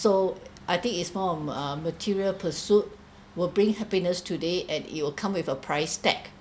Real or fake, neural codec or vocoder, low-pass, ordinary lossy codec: real; none; none; none